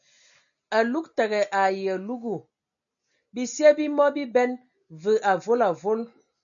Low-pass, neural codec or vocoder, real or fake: 7.2 kHz; none; real